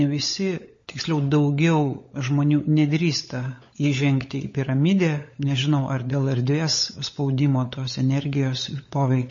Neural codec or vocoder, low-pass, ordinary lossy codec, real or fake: codec, 16 kHz, 16 kbps, FunCodec, trained on LibriTTS, 50 frames a second; 7.2 kHz; MP3, 32 kbps; fake